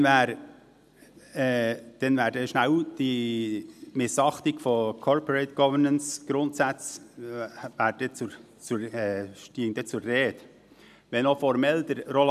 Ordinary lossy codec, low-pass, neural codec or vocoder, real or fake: none; 14.4 kHz; none; real